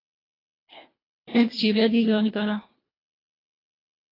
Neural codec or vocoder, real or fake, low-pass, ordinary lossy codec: codec, 24 kHz, 1.5 kbps, HILCodec; fake; 5.4 kHz; AAC, 24 kbps